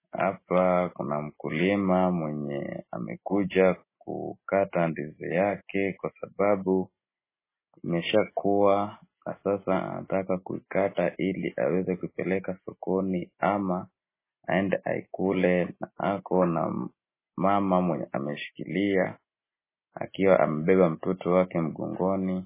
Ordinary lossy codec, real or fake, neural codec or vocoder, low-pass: MP3, 16 kbps; real; none; 3.6 kHz